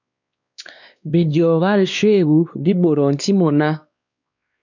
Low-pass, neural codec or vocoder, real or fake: 7.2 kHz; codec, 16 kHz, 2 kbps, X-Codec, WavLM features, trained on Multilingual LibriSpeech; fake